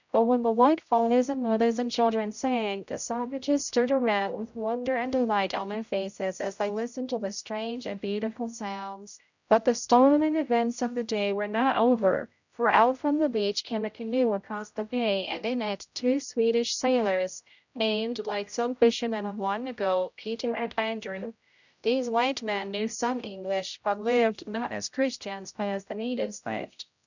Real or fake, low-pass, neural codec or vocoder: fake; 7.2 kHz; codec, 16 kHz, 0.5 kbps, X-Codec, HuBERT features, trained on general audio